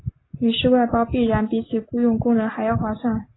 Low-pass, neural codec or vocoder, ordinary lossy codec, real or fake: 7.2 kHz; none; AAC, 16 kbps; real